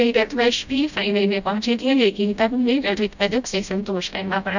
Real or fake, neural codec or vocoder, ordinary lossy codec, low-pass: fake; codec, 16 kHz, 0.5 kbps, FreqCodec, smaller model; none; 7.2 kHz